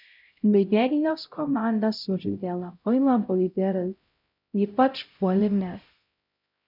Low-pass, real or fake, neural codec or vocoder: 5.4 kHz; fake; codec, 16 kHz, 0.5 kbps, X-Codec, HuBERT features, trained on LibriSpeech